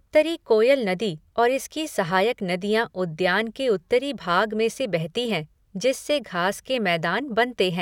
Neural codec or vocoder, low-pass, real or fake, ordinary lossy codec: none; 19.8 kHz; real; none